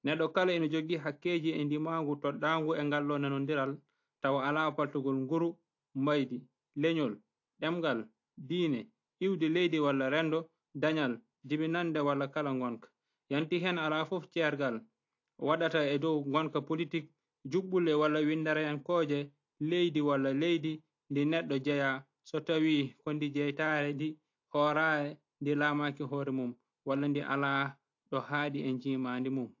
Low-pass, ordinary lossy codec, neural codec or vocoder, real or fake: 7.2 kHz; AAC, 48 kbps; none; real